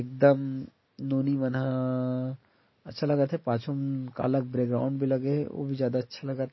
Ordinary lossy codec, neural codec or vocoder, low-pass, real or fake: MP3, 24 kbps; none; 7.2 kHz; real